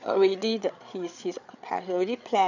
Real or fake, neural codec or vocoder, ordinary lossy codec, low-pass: fake; codec, 16 kHz, 8 kbps, FreqCodec, larger model; none; 7.2 kHz